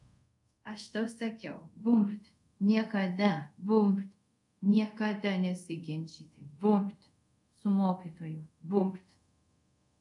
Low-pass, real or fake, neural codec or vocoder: 10.8 kHz; fake; codec, 24 kHz, 0.5 kbps, DualCodec